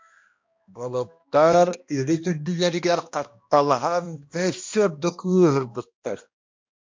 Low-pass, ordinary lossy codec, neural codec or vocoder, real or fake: 7.2 kHz; MP3, 48 kbps; codec, 16 kHz, 1 kbps, X-Codec, HuBERT features, trained on balanced general audio; fake